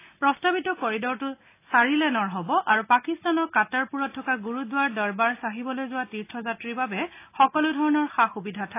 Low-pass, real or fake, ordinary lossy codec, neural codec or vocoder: 3.6 kHz; real; AAC, 24 kbps; none